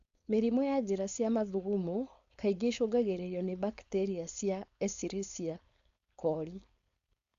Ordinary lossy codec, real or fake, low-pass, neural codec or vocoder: Opus, 64 kbps; fake; 7.2 kHz; codec, 16 kHz, 4.8 kbps, FACodec